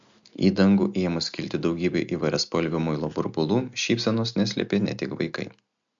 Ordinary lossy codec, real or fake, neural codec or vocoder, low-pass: MP3, 64 kbps; real; none; 7.2 kHz